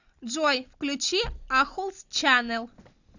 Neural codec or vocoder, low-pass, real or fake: none; 7.2 kHz; real